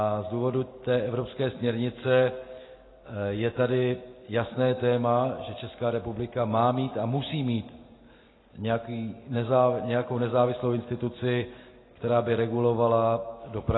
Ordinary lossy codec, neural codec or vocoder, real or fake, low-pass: AAC, 16 kbps; none; real; 7.2 kHz